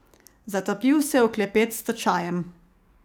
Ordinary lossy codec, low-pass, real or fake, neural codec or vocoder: none; none; fake; codec, 44.1 kHz, 7.8 kbps, DAC